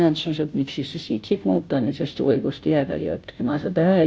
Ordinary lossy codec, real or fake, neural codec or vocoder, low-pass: none; fake; codec, 16 kHz, 0.5 kbps, FunCodec, trained on Chinese and English, 25 frames a second; none